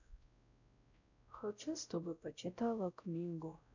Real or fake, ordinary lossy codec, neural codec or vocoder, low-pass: fake; none; codec, 16 kHz, 0.5 kbps, X-Codec, WavLM features, trained on Multilingual LibriSpeech; 7.2 kHz